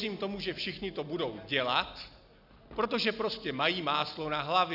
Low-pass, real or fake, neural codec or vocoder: 5.4 kHz; real; none